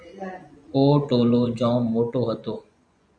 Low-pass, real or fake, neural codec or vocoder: 9.9 kHz; fake; vocoder, 24 kHz, 100 mel bands, Vocos